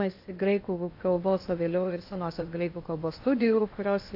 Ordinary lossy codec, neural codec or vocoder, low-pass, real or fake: AAC, 32 kbps; codec, 16 kHz in and 24 kHz out, 0.8 kbps, FocalCodec, streaming, 65536 codes; 5.4 kHz; fake